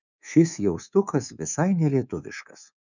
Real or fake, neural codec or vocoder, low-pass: fake; codec, 24 kHz, 3.1 kbps, DualCodec; 7.2 kHz